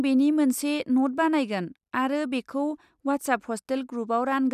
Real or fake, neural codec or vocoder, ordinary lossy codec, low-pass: real; none; none; 14.4 kHz